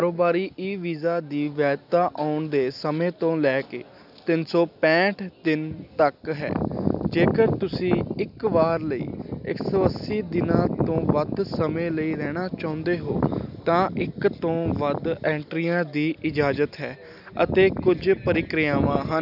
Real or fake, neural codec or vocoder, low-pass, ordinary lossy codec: real; none; 5.4 kHz; none